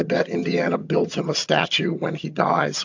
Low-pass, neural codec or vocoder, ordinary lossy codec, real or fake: 7.2 kHz; vocoder, 22.05 kHz, 80 mel bands, HiFi-GAN; AAC, 48 kbps; fake